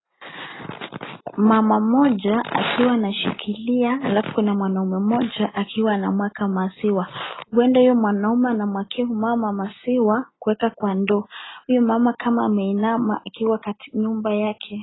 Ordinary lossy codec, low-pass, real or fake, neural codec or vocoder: AAC, 16 kbps; 7.2 kHz; real; none